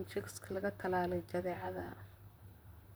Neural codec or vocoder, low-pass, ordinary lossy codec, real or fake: vocoder, 44.1 kHz, 128 mel bands, Pupu-Vocoder; none; none; fake